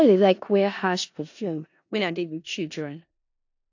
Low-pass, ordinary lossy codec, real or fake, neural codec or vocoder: 7.2 kHz; AAC, 48 kbps; fake; codec, 16 kHz in and 24 kHz out, 0.4 kbps, LongCat-Audio-Codec, four codebook decoder